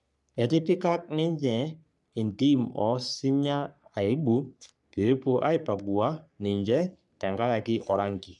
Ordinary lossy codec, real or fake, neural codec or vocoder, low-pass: none; fake; codec, 44.1 kHz, 3.4 kbps, Pupu-Codec; 10.8 kHz